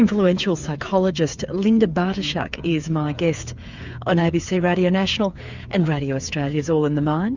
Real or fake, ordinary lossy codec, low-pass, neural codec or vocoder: fake; Opus, 64 kbps; 7.2 kHz; codec, 16 kHz, 8 kbps, FreqCodec, smaller model